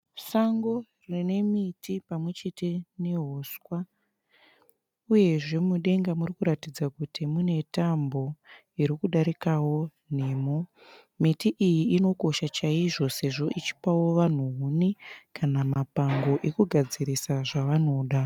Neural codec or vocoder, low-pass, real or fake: none; 19.8 kHz; real